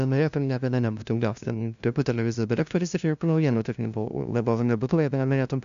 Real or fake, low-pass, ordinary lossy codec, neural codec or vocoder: fake; 7.2 kHz; AAC, 96 kbps; codec, 16 kHz, 0.5 kbps, FunCodec, trained on LibriTTS, 25 frames a second